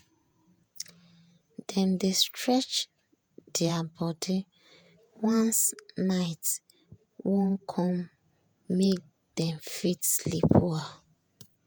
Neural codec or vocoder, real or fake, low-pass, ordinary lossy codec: vocoder, 48 kHz, 128 mel bands, Vocos; fake; none; none